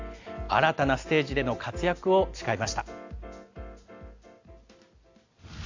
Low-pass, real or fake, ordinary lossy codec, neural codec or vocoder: 7.2 kHz; real; AAC, 48 kbps; none